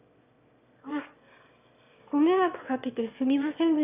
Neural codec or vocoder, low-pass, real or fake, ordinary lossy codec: autoencoder, 22.05 kHz, a latent of 192 numbers a frame, VITS, trained on one speaker; 3.6 kHz; fake; none